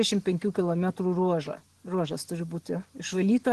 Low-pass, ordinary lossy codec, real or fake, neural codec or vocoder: 10.8 kHz; Opus, 16 kbps; real; none